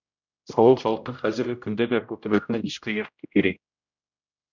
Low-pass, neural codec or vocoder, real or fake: 7.2 kHz; codec, 16 kHz, 0.5 kbps, X-Codec, HuBERT features, trained on general audio; fake